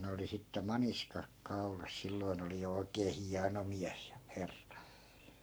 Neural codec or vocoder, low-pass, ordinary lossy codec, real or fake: codec, 44.1 kHz, 7.8 kbps, Pupu-Codec; none; none; fake